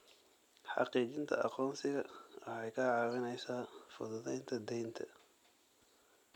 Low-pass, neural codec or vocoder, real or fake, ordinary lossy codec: 19.8 kHz; none; real; none